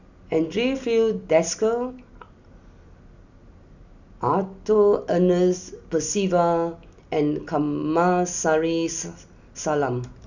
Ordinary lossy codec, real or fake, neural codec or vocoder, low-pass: none; real; none; 7.2 kHz